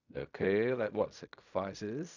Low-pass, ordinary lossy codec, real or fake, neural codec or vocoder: 7.2 kHz; none; fake; codec, 16 kHz in and 24 kHz out, 0.4 kbps, LongCat-Audio-Codec, fine tuned four codebook decoder